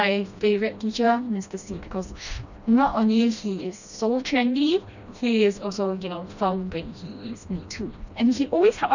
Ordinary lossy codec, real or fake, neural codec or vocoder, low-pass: none; fake; codec, 16 kHz, 1 kbps, FreqCodec, smaller model; 7.2 kHz